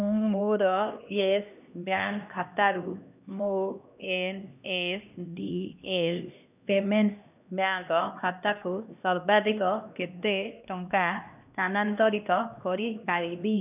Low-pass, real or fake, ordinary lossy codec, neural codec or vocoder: 3.6 kHz; fake; none; codec, 16 kHz, 1 kbps, X-Codec, HuBERT features, trained on LibriSpeech